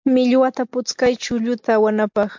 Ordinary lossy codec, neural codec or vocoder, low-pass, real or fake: MP3, 48 kbps; none; 7.2 kHz; real